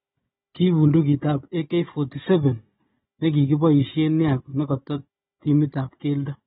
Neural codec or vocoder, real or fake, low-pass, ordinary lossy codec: codec, 16 kHz, 16 kbps, FunCodec, trained on Chinese and English, 50 frames a second; fake; 7.2 kHz; AAC, 16 kbps